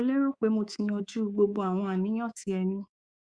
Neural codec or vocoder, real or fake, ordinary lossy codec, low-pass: codec, 24 kHz, 3.1 kbps, DualCodec; fake; Opus, 64 kbps; 9.9 kHz